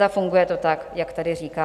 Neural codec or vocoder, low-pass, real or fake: none; 14.4 kHz; real